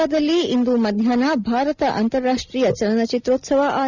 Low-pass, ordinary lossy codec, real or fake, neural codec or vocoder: 7.2 kHz; none; real; none